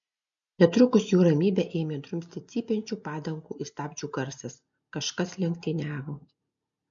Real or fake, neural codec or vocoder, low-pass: real; none; 7.2 kHz